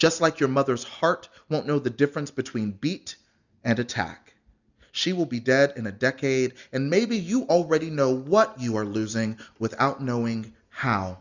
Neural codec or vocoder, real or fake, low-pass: none; real; 7.2 kHz